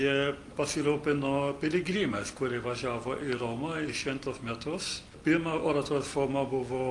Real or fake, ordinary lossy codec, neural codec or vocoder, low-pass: real; Opus, 32 kbps; none; 10.8 kHz